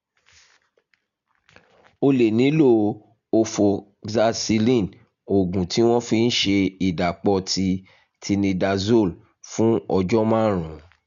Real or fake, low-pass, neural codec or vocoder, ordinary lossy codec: real; 7.2 kHz; none; none